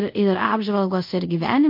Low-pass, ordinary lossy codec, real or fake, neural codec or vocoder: 5.4 kHz; MP3, 32 kbps; fake; codec, 24 kHz, 0.5 kbps, DualCodec